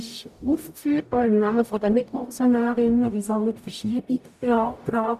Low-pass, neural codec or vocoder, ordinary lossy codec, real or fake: 14.4 kHz; codec, 44.1 kHz, 0.9 kbps, DAC; none; fake